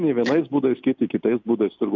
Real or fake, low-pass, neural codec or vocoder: real; 7.2 kHz; none